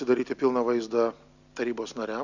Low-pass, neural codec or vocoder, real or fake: 7.2 kHz; none; real